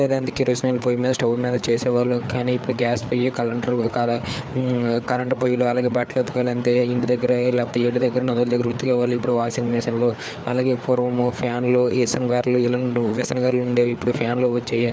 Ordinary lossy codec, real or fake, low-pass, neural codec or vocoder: none; fake; none; codec, 16 kHz, 4 kbps, FreqCodec, larger model